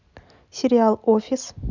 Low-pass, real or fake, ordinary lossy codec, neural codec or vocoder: 7.2 kHz; real; none; none